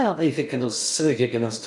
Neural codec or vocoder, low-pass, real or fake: codec, 16 kHz in and 24 kHz out, 0.6 kbps, FocalCodec, streaming, 4096 codes; 10.8 kHz; fake